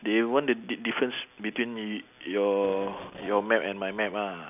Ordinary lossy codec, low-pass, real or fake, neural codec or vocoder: none; 3.6 kHz; real; none